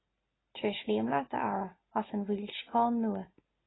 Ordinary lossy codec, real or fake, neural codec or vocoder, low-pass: AAC, 16 kbps; real; none; 7.2 kHz